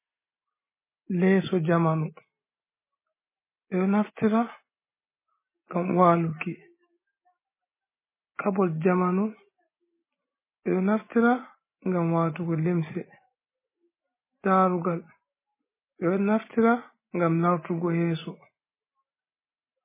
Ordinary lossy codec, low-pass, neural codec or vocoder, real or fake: MP3, 16 kbps; 3.6 kHz; none; real